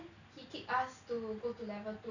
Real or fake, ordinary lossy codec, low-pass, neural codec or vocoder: real; none; 7.2 kHz; none